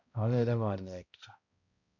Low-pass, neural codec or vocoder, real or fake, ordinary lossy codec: 7.2 kHz; codec, 16 kHz, 0.5 kbps, X-Codec, HuBERT features, trained on balanced general audio; fake; none